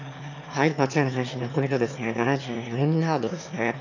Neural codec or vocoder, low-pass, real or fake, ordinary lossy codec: autoencoder, 22.05 kHz, a latent of 192 numbers a frame, VITS, trained on one speaker; 7.2 kHz; fake; none